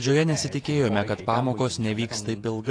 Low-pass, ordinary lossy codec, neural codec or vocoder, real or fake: 9.9 kHz; AAC, 48 kbps; vocoder, 48 kHz, 128 mel bands, Vocos; fake